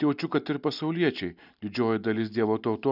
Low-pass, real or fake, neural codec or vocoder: 5.4 kHz; real; none